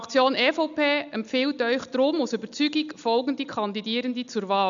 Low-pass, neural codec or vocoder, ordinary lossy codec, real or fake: 7.2 kHz; none; none; real